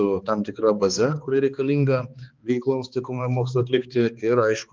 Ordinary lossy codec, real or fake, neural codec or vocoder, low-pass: Opus, 32 kbps; fake; codec, 16 kHz, 2 kbps, X-Codec, HuBERT features, trained on balanced general audio; 7.2 kHz